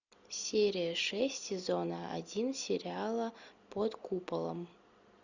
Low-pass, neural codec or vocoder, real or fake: 7.2 kHz; none; real